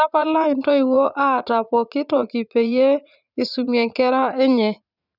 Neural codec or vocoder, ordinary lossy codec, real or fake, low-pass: vocoder, 44.1 kHz, 80 mel bands, Vocos; none; fake; 5.4 kHz